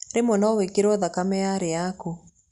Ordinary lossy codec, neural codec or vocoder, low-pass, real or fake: Opus, 64 kbps; none; 10.8 kHz; real